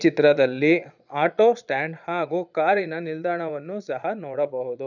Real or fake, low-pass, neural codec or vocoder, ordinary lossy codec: fake; 7.2 kHz; vocoder, 22.05 kHz, 80 mel bands, Vocos; none